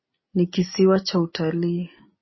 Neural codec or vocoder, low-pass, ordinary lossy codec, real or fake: none; 7.2 kHz; MP3, 24 kbps; real